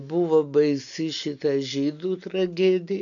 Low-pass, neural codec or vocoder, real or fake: 7.2 kHz; none; real